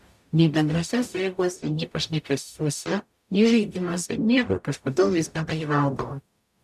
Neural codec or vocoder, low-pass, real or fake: codec, 44.1 kHz, 0.9 kbps, DAC; 14.4 kHz; fake